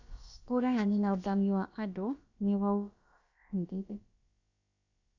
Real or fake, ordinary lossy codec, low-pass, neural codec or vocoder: fake; Opus, 64 kbps; 7.2 kHz; codec, 16 kHz, about 1 kbps, DyCAST, with the encoder's durations